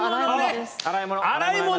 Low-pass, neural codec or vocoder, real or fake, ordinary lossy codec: none; none; real; none